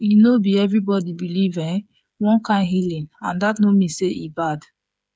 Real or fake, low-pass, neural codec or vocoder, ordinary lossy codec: fake; none; codec, 16 kHz, 8 kbps, FreqCodec, smaller model; none